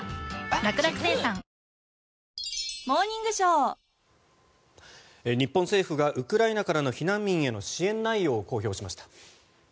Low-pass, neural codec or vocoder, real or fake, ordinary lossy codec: none; none; real; none